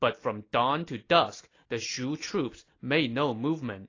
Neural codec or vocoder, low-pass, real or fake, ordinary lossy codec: none; 7.2 kHz; real; AAC, 32 kbps